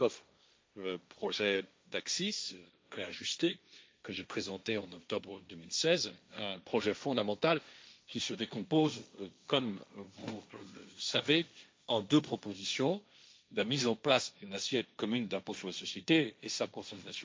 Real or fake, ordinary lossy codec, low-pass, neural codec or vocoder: fake; none; none; codec, 16 kHz, 1.1 kbps, Voila-Tokenizer